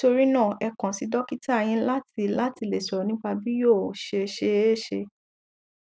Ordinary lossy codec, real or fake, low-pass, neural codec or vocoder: none; real; none; none